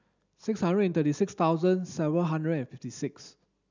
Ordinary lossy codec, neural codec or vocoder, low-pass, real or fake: none; none; 7.2 kHz; real